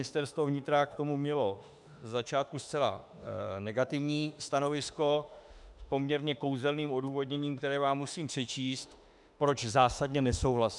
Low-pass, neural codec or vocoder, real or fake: 10.8 kHz; autoencoder, 48 kHz, 32 numbers a frame, DAC-VAE, trained on Japanese speech; fake